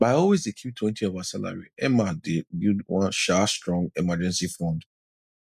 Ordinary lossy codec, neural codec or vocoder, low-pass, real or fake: none; none; 14.4 kHz; real